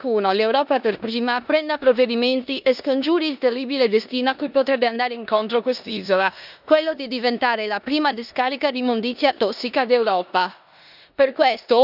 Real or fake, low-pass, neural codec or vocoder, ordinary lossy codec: fake; 5.4 kHz; codec, 16 kHz in and 24 kHz out, 0.9 kbps, LongCat-Audio-Codec, four codebook decoder; none